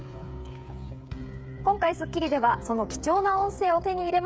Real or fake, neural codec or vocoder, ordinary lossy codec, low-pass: fake; codec, 16 kHz, 8 kbps, FreqCodec, smaller model; none; none